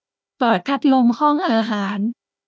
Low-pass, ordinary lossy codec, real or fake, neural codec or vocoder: none; none; fake; codec, 16 kHz, 1 kbps, FunCodec, trained on Chinese and English, 50 frames a second